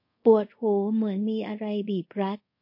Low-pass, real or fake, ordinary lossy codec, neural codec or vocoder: 5.4 kHz; fake; MP3, 32 kbps; codec, 24 kHz, 0.5 kbps, DualCodec